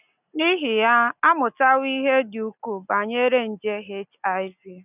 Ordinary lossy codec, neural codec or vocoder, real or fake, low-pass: none; none; real; 3.6 kHz